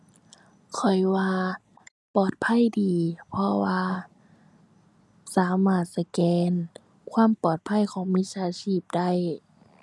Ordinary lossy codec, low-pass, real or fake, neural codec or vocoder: none; none; real; none